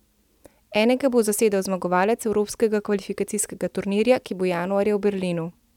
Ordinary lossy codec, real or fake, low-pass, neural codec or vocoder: none; real; 19.8 kHz; none